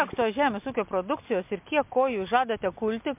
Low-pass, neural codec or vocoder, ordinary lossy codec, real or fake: 3.6 kHz; none; MP3, 32 kbps; real